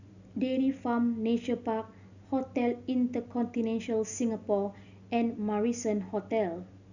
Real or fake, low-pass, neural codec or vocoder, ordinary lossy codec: real; 7.2 kHz; none; none